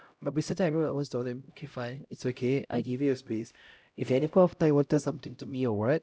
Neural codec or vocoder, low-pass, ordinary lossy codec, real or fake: codec, 16 kHz, 0.5 kbps, X-Codec, HuBERT features, trained on LibriSpeech; none; none; fake